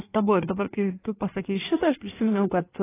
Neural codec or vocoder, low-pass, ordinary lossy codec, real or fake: codec, 16 kHz in and 24 kHz out, 1.1 kbps, FireRedTTS-2 codec; 3.6 kHz; AAC, 16 kbps; fake